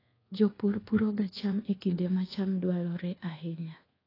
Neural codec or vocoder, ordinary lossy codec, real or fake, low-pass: codec, 24 kHz, 1.2 kbps, DualCodec; AAC, 24 kbps; fake; 5.4 kHz